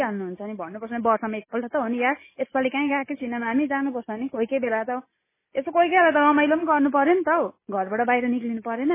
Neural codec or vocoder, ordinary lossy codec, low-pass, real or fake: none; MP3, 16 kbps; 3.6 kHz; real